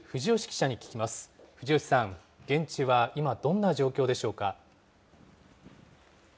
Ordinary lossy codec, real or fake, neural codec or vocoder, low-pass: none; real; none; none